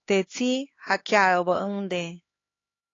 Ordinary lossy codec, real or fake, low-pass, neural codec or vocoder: AAC, 32 kbps; fake; 7.2 kHz; codec, 16 kHz, 2 kbps, X-Codec, HuBERT features, trained on LibriSpeech